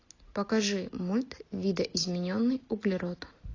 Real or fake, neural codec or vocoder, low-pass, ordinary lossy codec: real; none; 7.2 kHz; AAC, 32 kbps